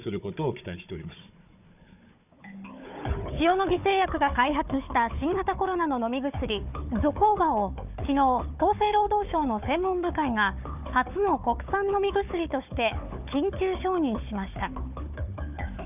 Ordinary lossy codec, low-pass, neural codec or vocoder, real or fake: none; 3.6 kHz; codec, 16 kHz, 4 kbps, FunCodec, trained on Chinese and English, 50 frames a second; fake